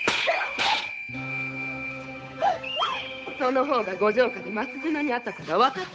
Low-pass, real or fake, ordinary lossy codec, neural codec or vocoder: none; fake; none; codec, 16 kHz, 8 kbps, FunCodec, trained on Chinese and English, 25 frames a second